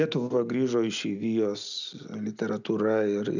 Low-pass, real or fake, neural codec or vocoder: 7.2 kHz; real; none